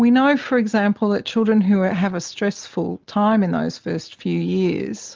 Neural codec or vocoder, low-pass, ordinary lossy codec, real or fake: none; 7.2 kHz; Opus, 32 kbps; real